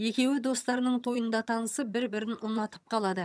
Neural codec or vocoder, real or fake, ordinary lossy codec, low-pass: vocoder, 22.05 kHz, 80 mel bands, HiFi-GAN; fake; none; none